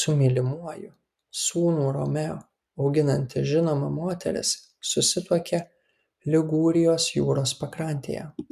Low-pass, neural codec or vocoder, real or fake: 14.4 kHz; none; real